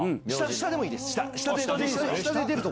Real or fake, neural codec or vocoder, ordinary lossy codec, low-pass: real; none; none; none